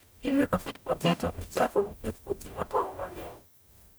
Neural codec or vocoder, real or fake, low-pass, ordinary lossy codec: codec, 44.1 kHz, 0.9 kbps, DAC; fake; none; none